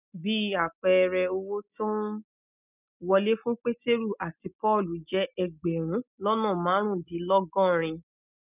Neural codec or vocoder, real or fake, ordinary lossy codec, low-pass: none; real; none; 3.6 kHz